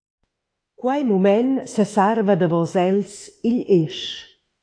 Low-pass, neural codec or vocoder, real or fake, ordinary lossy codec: 9.9 kHz; autoencoder, 48 kHz, 32 numbers a frame, DAC-VAE, trained on Japanese speech; fake; AAC, 48 kbps